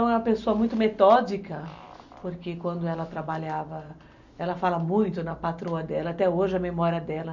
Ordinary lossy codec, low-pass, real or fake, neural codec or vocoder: none; 7.2 kHz; real; none